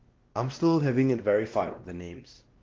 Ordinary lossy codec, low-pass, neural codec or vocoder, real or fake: Opus, 16 kbps; 7.2 kHz; codec, 16 kHz, 1 kbps, X-Codec, WavLM features, trained on Multilingual LibriSpeech; fake